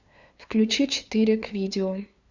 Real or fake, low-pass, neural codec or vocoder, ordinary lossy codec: fake; 7.2 kHz; codec, 16 kHz, 2 kbps, FunCodec, trained on LibriTTS, 25 frames a second; Opus, 64 kbps